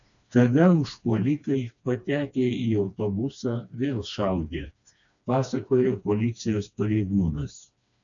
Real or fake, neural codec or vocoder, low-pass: fake; codec, 16 kHz, 2 kbps, FreqCodec, smaller model; 7.2 kHz